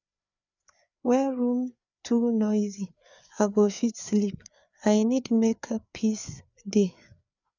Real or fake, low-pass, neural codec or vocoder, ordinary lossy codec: fake; 7.2 kHz; codec, 16 kHz, 4 kbps, FreqCodec, larger model; none